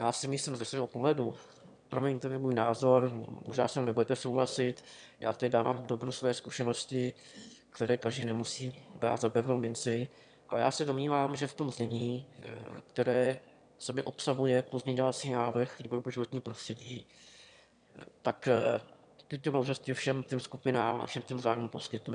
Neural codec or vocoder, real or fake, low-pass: autoencoder, 22.05 kHz, a latent of 192 numbers a frame, VITS, trained on one speaker; fake; 9.9 kHz